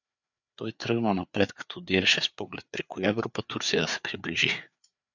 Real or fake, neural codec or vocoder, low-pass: fake; codec, 16 kHz, 4 kbps, FreqCodec, larger model; 7.2 kHz